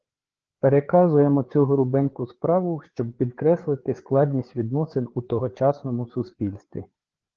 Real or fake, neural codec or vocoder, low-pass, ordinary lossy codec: fake; codec, 16 kHz, 4 kbps, FreqCodec, larger model; 7.2 kHz; Opus, 16 kbps